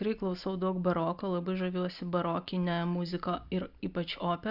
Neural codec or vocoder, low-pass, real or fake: none; 5.4 kHz; real